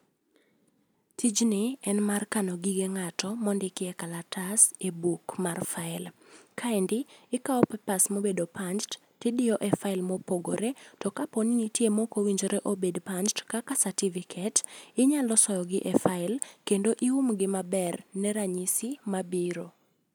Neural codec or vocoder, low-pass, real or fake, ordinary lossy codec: none; none; real; none